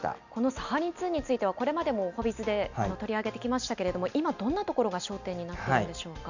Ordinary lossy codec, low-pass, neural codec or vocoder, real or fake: none; 7.2 kHz; none; real